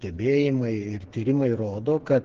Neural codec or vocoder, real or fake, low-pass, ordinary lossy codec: codec, 16 kHz, 4 kbps, FreqCodec, smaller model; fake; 7.2 kHz; Opus, 16 kbps